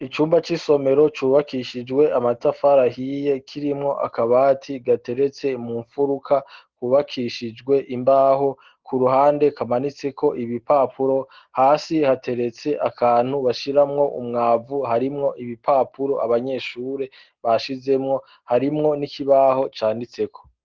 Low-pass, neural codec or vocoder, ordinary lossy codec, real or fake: 7.2 kHz; none; Opus, 16 kbps; real